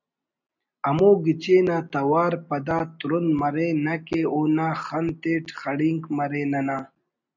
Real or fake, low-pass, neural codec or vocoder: real; 7.2 kHz; none